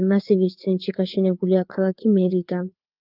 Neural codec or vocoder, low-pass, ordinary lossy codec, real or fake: codec, 16 kHz, 4 kbps, FreqCodec, larger model; 5.4 kHz; Opus, 32 kbps; fake